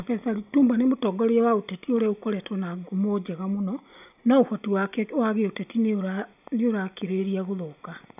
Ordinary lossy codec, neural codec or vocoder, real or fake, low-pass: none; none; real; 3.6 kHz